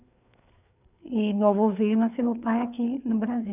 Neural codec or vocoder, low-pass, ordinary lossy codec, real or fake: codec, 16 kHz, 4 kbps, FreqCodec, larger model; 3.6 kHz; none; fake